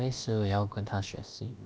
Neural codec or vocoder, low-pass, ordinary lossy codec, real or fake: codec, 16 kHz, about 1 kbps, DyCAST, with the encoder's durations; none; none; fake